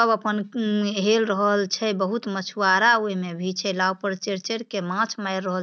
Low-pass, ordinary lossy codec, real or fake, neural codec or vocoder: none; none; real; none